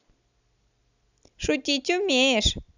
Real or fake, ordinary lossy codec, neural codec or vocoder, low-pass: real; none; none; 7.2 kHz